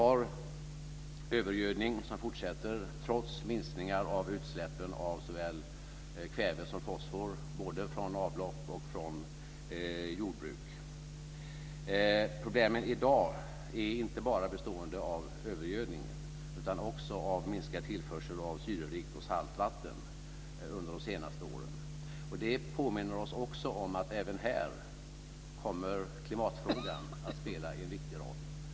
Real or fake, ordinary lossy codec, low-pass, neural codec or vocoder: real; none; none; none